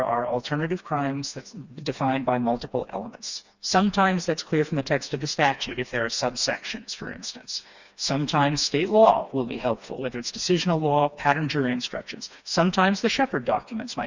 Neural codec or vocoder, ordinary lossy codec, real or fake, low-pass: codec, 16 kHz, 2 kbps, FreqCodec, smaller model; Opus, 64 kbps; fake; 7.2 kHz